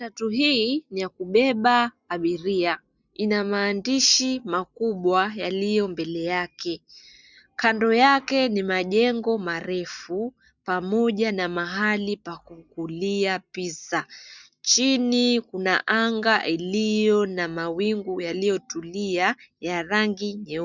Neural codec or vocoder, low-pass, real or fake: none; 7.2 kHz; real